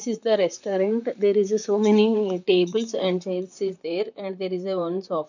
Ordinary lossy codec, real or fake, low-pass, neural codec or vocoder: none; fake; 7.2 kHz; vocoder, 44.1 kHz, 128 mel bands, Pupu-Vocoder